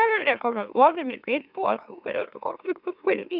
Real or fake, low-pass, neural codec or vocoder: fake; 5.4 kHz; autoencoder, 44.1 kHz, a latent of 192 numbers a frame, MeloTTS